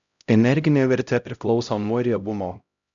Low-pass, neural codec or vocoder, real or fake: 7.2 kHz; codec, 16 kHz, 0.5 kbps, X-Codec, HuBERT features, trained on LibriSpeech; fake